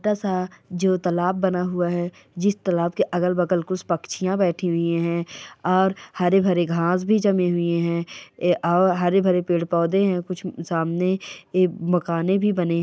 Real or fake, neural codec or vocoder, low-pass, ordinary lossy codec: real; none; none; none